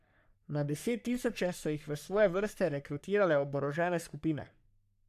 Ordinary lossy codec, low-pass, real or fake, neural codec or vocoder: none; 14.4 kHz; fake; codec, 44.1 kHz, 3.4 kbps, Pupu-Codec